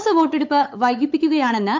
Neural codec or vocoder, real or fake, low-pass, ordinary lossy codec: codec, 16 kHz, 8 kbps, FunCodec, trained on LibriTTS, 25 frames a second; fake; 7.2 kHz; MP3, 64 kbps